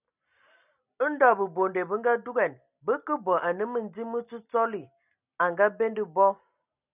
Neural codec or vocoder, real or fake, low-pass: none; real; 3.6 kHz